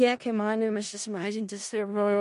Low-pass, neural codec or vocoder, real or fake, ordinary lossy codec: 10.8 kHz; codec, 16 kHz in and 24 kHz out, 0.4 kbps, LongCat-Audio-Codec, four codebook decoder; fake; MP3, 48 kbps